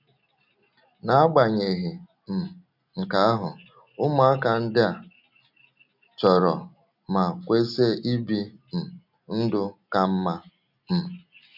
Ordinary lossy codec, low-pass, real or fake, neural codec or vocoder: none; 5.4 kHz; real; none